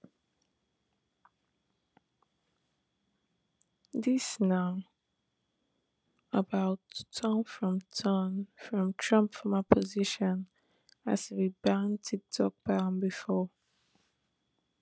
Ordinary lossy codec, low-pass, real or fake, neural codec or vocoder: none; none; real; none